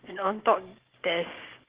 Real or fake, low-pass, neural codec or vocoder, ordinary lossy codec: fake; 3.6 kHz; codec, 16 kHz, 6 kbps, DAC; Opus, 16 kbps